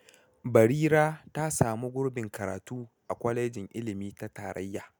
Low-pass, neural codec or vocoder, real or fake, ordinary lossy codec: none; none; real; none